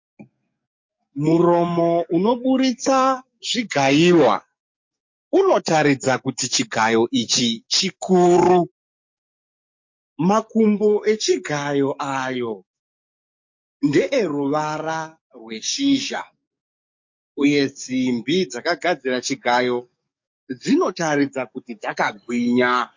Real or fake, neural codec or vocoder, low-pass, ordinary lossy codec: fake; codec, 44.1 kHz, 7.8 kbps, DAC; 7.2 kHz; MP3, 48 kbps